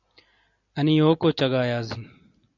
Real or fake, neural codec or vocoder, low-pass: real; none; 7.2 kHz